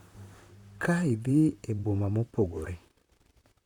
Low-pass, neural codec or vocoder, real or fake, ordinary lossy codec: 19.8 kHz; vocoder, 44.1 kHz, 128 mel bands, Pupu-Vocoder; fake; none